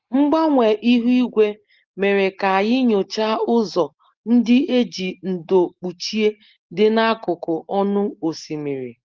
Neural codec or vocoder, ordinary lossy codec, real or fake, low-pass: none; Opus, 16 kbps; real; 7.2 kHz